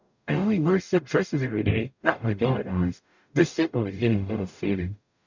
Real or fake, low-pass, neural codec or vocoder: fake; 7.2 kHz; codec, 44.1 kHz, 0.9 kbps, DAC